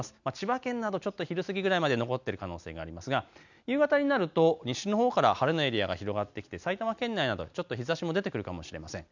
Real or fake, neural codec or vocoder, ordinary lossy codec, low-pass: real; none; none; 7.2 kHz